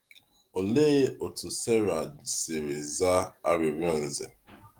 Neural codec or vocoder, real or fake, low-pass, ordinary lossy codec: codec, 44.1 kHz, 7.8 kbps, DAC; fake; 19.8 kHz; Opus, 32 kbps